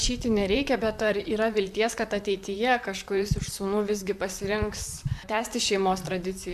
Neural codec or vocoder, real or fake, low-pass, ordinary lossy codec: vocoder, 44.1 kHz, 128 mel bands, Pupu-Vocoder; fake; 14.4 kHz; MP3, 96 kbps